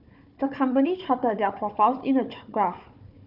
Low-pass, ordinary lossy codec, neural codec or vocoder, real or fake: 5.4 kHz; none; codec, 16 kHz, 4 kbps, FunCodec, trained on Chinese and English, 50 frames a second; fake